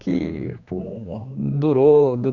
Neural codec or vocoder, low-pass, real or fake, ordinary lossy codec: codec, 16 kHz, 2 kbps, X-Codec, HuBERT features, trained on general audio; 7.2 kHz; fake; Opus, 64 kbps